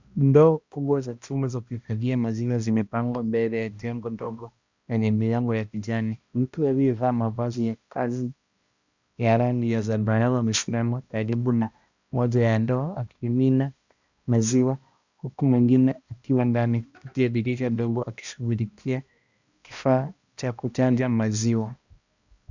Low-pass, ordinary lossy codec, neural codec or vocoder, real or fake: 7.2 kHz; Opus, 64 kbps; codec, 16 kHz, 1 kbps, X-Codec, HuBERT features, trained on balanced general audio; fake